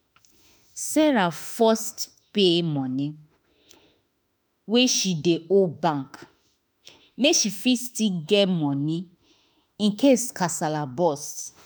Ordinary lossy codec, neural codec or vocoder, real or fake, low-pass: none; autoencoder, 48 kHz, 32 numbers a frame, DAC-VAE, trained on Japanese speech; fake; none